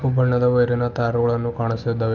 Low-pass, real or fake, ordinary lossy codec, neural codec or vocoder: 7.2 kHz; real; Opus, 24 kbps; none